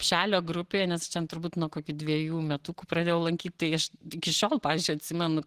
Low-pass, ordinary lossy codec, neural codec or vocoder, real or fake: 14.4 kHz; Opus, 16 kbps; none; real